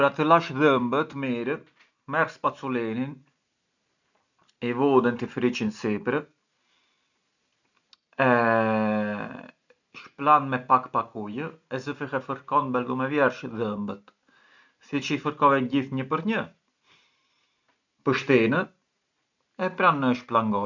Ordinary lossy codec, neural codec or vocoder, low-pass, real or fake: none; none; 7.2 kHz; real